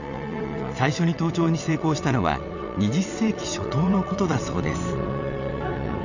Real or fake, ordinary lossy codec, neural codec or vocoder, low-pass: fake; none; vocoder, 22.05 kHz, 80 mel bands, WaveNeXt; 7.2 kHz